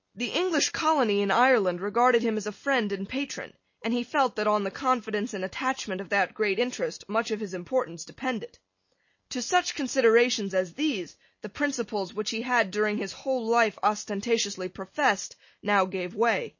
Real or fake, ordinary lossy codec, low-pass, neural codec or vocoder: real; MP3, 32 kbps; 7.2 kHz; none